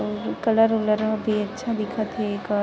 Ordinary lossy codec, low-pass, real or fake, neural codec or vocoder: none; none; real; none